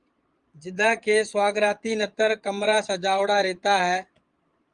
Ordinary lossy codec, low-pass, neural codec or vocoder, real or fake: Opus, 32 kbps; 9.9 kHz; vocoder, 22.05 kHz, 80 mel bands, Vocos; fake